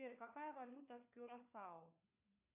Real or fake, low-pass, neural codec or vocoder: fake; 3.6 kHz; codec, 16 kHz, 4 kbps, FunCodec, trained on LibriTTS, 50 frames a second